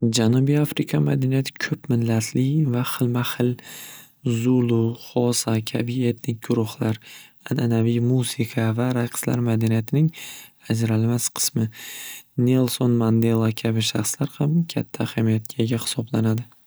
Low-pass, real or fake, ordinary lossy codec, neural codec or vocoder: none; real; none; none